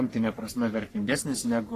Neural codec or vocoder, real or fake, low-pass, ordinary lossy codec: codec, 44.1 kHz, 3.4 kbps, Pupu-Codec; fake; 14.4 kHz; AAC, 48 kbps